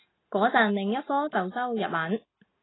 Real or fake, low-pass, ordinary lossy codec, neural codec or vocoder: real; 7.2 kHz; AAC, 16 kbps; none